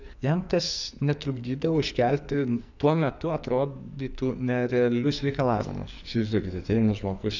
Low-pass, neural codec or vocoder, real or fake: 7.2 kHz; codec, 44.1 kHz, 2.6 kbps, SNAC; fake